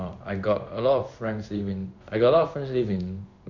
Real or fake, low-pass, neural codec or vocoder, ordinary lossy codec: fake; 7.2 kHz; codec, 16 kHz in and 24 kHz out, 1 kbps, XY-Tokenizer; none